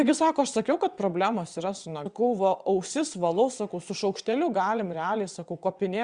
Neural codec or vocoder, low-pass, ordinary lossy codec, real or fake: vocoder, 22.05 kHz, 80 mel bands, WaveNeXt; 9.9 kHz; Opus, 64 kbps; fake